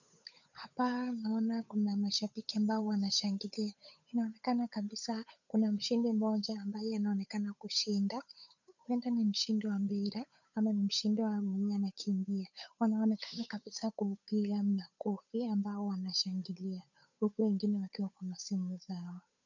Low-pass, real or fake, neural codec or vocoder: 7.2 kHz; fake; codec, 16 kHz, 8 kbps, FunCodec, trained on Chinese and English, 25 frames a second